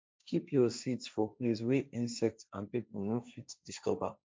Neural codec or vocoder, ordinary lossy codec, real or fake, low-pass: codec, 16 kHz, 1.1 kbps, Voila-Tokenizer; none; fake; none